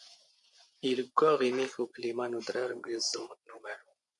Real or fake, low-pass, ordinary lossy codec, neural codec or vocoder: fake; 10.8 kHz; MP3, 96 kbps; vocoder, 24 kHz, 100 mel bands, Vocos